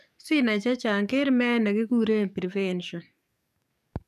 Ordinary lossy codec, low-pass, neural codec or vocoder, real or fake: none; 14.4 kHz; codec, 44.1 kHz, 7.8 kbps, DAC; fake